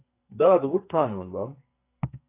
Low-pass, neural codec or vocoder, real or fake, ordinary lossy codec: 3.6 kHz; codec, 44.1 kHz, 2.6 kbps, SNAC; fake; AAC, 24 kbps